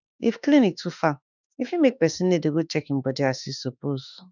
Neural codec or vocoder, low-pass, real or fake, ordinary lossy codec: autoencoder, 48 kHz, 32 numbers a frame, DAC-VAE, trained on Japanese speech; 7.2 kHz; fake; none